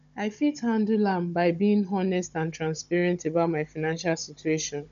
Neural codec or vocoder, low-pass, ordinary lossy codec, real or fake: codec, 16 kHz, 16 kbps, FunCodec, trained on Chinese and English, 50 frames a second; 7.2 kHz; none; fake